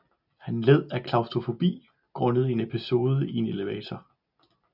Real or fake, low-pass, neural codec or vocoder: real; 5.4 kHz; none